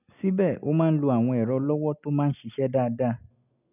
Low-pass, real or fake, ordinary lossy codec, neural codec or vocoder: 3.6 kHz; real; none; none